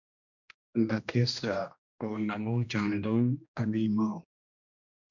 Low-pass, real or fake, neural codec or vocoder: 7.2 kHz; fake; codec, 16 kHz, 1 kbps, X-Codec, HuBERT features, trained on general audio